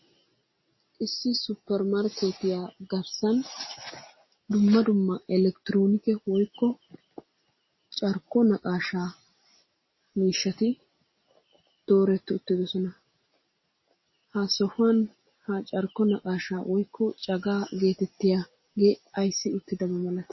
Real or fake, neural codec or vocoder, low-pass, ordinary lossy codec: real; none; 7.2 kHz; MP3, 24 kbps